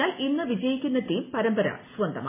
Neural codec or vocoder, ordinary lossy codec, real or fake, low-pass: none; MP3, 16 kbps; real; 3.6 kHz